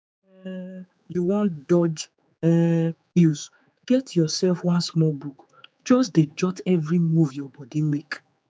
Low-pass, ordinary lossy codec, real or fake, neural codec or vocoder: none; none; fake; codec, 16 kHz, 4 kbps, X-Codec, HuBERT features, trained on general audio